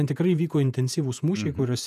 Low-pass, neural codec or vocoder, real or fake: 14.4 kHz; vocoder, 48 kHz, 128 mel bands, Vocos; fake